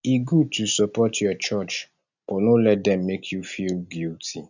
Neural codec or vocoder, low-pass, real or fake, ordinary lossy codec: none; 7.2 kHz; real; none